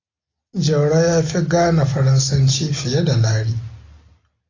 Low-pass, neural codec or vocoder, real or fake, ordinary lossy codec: 7.2 kHz; none; real; AAC, 32 kbps